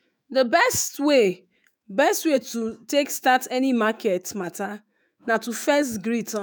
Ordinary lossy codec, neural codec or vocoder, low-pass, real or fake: none; autoencoder, 48 kHz, 128 numbers a frame, DAC-VAE, trained on Japanese speech; none; fake